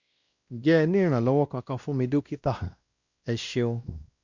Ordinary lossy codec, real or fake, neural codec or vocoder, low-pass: Opus, 64 kbps; fake; codec, 16 kHz, 1 kbps, X-Codec, WavLM features, trained on Multilingual LibriSpeech; 7.2 kHz